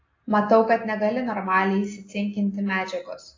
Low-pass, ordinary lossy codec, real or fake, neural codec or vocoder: 7.2 kHz; AAC, 32 kbps; real; none